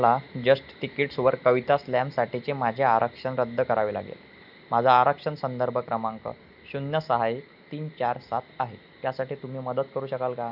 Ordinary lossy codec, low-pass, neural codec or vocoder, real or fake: none; 5.4 kHz; none; real